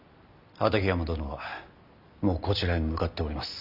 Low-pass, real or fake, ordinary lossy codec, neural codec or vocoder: 5.4 kHz; real; none; none